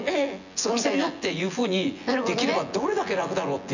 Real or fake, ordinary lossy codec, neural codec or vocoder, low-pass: fake; none; vocoder, 24 kHz, 100 mel bands, Vocos; 7.2 kHz